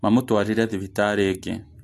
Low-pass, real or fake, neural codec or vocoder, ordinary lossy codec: 14.4 kHz; real; none; AAC, 64 kbps